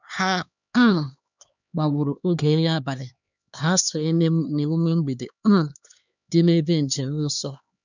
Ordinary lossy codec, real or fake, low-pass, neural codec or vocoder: none; fake; 7.2 kHz; codec, 16 kHz, 2 kbps, X-Codec, HuBERT features, trained on LibriSpeech